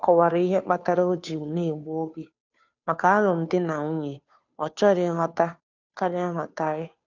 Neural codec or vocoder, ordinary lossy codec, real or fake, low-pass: codec, 16 kHz, 2 kbps, FunCodec, trained on Chinese and English, 25 frames a second; none; fake; 7.2 kHz